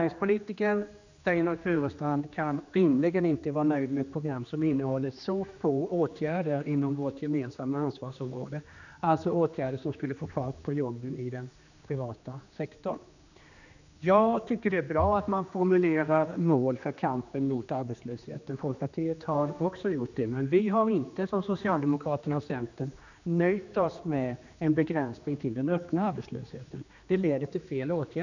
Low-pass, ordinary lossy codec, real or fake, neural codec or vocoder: 7.2 kHz; none; fake; codec, 16 kHz, 2 kbps, X-Codec, HuBERT features, trained on general audio